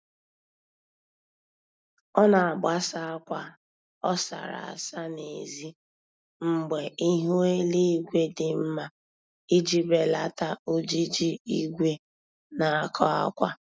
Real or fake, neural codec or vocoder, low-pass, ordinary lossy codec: real; none; none; none